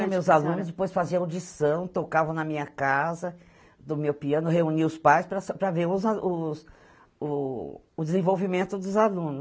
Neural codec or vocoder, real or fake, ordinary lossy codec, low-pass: none; real; none; none